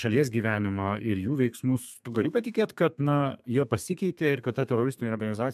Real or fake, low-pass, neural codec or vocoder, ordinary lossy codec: fake; 14.4 kHz; codec, 32 kHz, 1.9 kbps, SNAC; MP3, 96 kbps